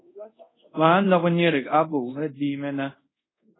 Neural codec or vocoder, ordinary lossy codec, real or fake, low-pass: codec, 24 kHz, 0.5 kbps, DualCodec; AAC, 16 kbps; fake; 7.2 kHz